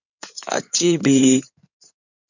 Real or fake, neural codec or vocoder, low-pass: fake; codec, 16 kHz in and 24 kHz out, 2.2 kbps, FireRedTTS-2 codec; 7.2 kHz